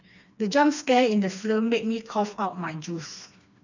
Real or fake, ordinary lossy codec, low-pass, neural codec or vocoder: fake; none; 7.2 kHz; codec, 16 kHz, 2 kbps, FreqCodec, smaller model